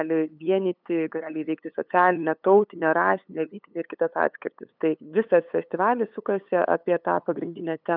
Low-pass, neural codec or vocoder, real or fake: 5.4 kHz; codec, 16 kHz, 8 kbps, FunCodec, trained on LibriTTS, 25 frames a second; fake